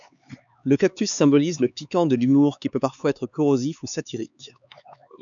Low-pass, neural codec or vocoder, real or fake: 7.2 kHz; codec, 16 kHz, 4 kbps, X-Codec, HuBERT features, trained on LibriSpeech; fake